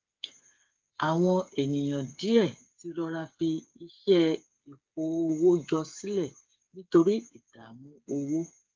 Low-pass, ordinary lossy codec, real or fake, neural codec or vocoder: 7.2 kHz; Opus, 24 kbps; fake; codec, 16 kHz, 8 kbps, FreqCodec, smaller model